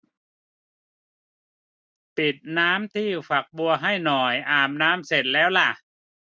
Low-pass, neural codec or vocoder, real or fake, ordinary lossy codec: none; none; real; none